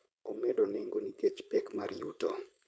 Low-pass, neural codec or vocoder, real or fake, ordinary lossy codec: none; codec, 16 kHz, 4.8 kbps, FACodec; fake; none